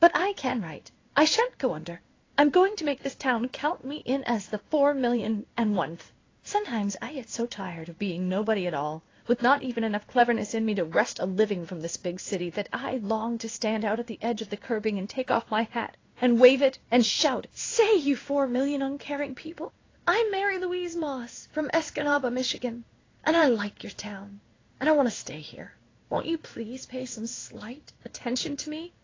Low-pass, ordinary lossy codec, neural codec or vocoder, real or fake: 7.2 kHz; AAC, 32 kbps; codec, 16 kHz in and 24 kHz out, 1 kbps, XY-Tokenizer; fake